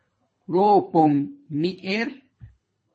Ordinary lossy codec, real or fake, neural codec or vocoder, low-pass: MP3, 32 kbps; fake; codec, 24 kHz, 3 kbps, HILCodec; 9.9 kHz